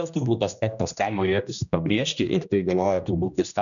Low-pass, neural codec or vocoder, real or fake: 7.2 kHz; codec, 16 kHz, 1 kbps, X-Codec, HuBERT features, trained on general audio; fake